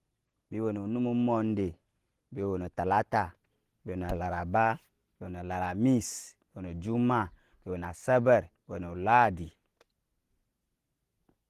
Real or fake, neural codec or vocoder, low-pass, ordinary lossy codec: real; none; 14.4 kHz; Opus, 16 kbps